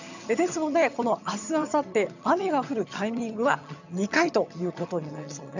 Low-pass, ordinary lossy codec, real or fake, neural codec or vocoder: 7.2 kHz; none; fake; vocoder, 22.05 kHz, 80 mel bands, HiFi-GAN